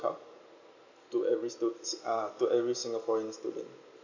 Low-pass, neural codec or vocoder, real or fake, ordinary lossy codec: 7.2 kHz; none; real; none